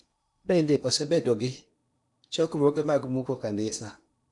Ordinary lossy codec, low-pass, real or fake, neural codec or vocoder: none; 10.8 kHz; fake; codec, 16 kHz in and 24 kHz out, 0.8 kbps, FocalCodec, streaming, 65536 codes